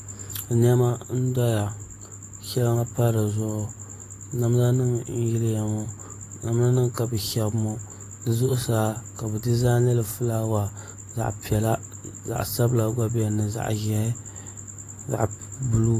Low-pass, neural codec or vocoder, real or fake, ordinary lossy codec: 14.4 kHz; none; real; AAC, 48 kbps